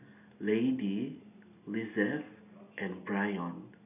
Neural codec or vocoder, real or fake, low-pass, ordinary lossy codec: none; real; 3.6 kHz; none